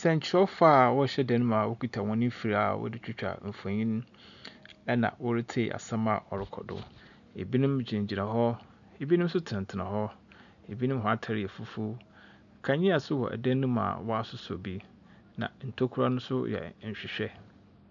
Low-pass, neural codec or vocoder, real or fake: 7.2 kHz; none; real